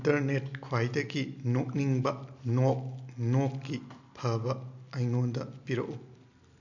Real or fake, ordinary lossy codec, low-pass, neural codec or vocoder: real; none; 7.2 kHz; none